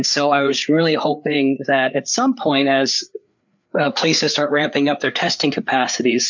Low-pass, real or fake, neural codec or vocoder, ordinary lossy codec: 7.2 kHz; fake; codec, 16 kHz, 4 kbps, FreqCodec, larger model; MP3, 64 kbps